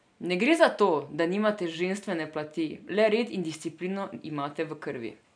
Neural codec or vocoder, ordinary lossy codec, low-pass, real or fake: none; none; 9.9 kHz; real